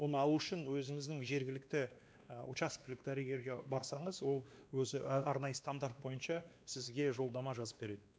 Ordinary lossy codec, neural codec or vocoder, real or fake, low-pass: none; codec, 16 kHz, 2 kbps, X-Codec, WavLM features, trained on Multilingual LibriSpeech; fake; none